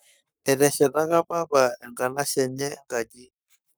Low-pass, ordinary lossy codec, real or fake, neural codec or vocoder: none; none; fake; codec, 44.1 kHz, 7.8 kbps, Pupu-Codec